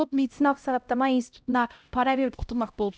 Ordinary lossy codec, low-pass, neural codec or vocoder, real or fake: none; none; codec, 16 kHz, 0.5 kbps, X-Codec, HuBERT features, trained on LibriSpeech; fake